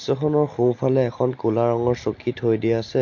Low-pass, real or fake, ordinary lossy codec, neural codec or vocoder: 7.2 kHz; real; MP3, 48 kbps; none